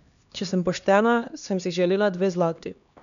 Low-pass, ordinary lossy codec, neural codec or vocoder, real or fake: 7.2 kHz; none; codec, 16 kHz, 2 kbps, X-Codec, HuBERT features, trained on LibriSpeech; fake